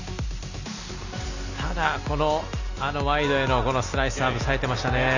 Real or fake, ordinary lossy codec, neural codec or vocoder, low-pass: real; none; none; 7.2 kHz